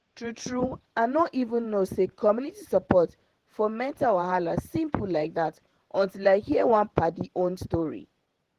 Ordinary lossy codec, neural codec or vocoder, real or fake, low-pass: Opus, 16 kbps; vocoder, 48 kHz, 128 mel bands, Vocos; fake; 14.4 kHz